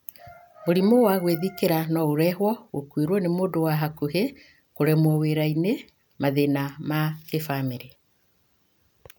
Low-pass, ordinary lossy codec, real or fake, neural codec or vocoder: none; none; real; none